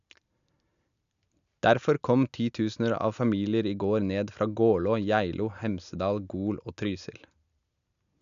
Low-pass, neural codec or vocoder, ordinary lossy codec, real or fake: 7.2 kHz; none; none; real